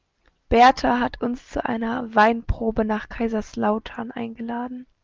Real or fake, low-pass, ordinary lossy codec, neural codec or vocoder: real; 7.2 kHz; Opus, 24 kbps; none